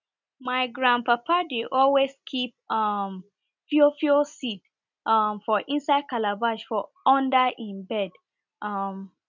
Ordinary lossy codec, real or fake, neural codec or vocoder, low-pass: none; real; none; 7.2 kHz